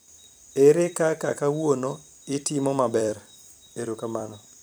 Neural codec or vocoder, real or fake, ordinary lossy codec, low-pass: vocoder, 44.1 kHz, 128 mel bands every 256 samples, BigVGAN v2; fake; none; none